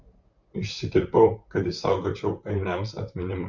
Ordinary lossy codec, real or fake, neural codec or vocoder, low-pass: Opus, 64 kbps; fake; vocoder, 44.1 kHz, 128 mel bands, Pupu-Vocoder; 7.2 kHz